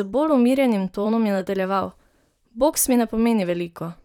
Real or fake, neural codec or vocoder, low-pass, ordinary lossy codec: fake; vocoder, 44.1 kHz, 128 mel bands, Pupu-Vocoder; 19.8 kHz; none